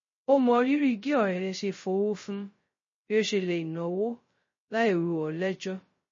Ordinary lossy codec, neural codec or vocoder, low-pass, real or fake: MP3, 32 kbps; codec, 16 kHz, 0.2 kbps, FocalCodec; 7.2 kHz; fake